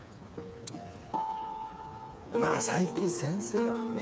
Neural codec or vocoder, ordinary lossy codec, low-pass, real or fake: codec, 16 kHz, 4 kbps, FreqCodec, smaller model; none; none; fake